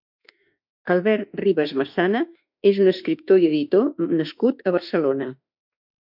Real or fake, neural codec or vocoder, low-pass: fake; autoencoder, 48 kHz, 32 numbers a frame, DAC-VAE, trained on Japanese speech; 5.4 kHz